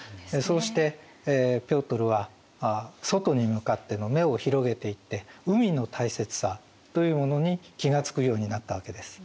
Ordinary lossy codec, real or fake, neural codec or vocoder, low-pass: none; real; none; none